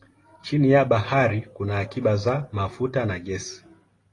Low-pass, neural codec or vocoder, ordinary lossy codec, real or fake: 10.8 kHz; none; AAC, 32 kbps; real